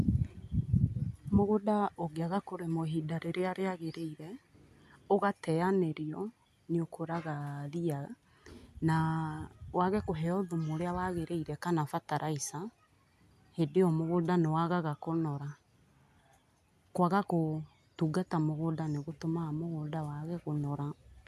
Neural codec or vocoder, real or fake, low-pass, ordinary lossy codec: none; real; 14.4 kHz; none